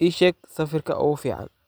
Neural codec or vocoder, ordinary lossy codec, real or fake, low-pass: none; none; real; none